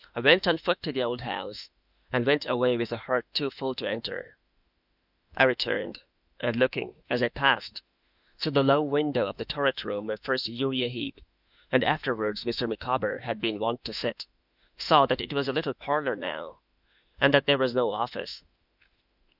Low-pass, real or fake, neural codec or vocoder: 5.4 kHz; fake; autoencoder, 48 kHz, 32 numbers a frame, DAC-VAE, trained on Japanese speech